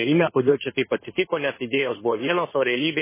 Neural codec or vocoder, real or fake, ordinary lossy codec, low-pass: codec, 16 kHz in and 24 kHz out, 2.2 kbps, FireRedTTS-2 codec; fake; MP3, 16 kbps; 3.6 kHz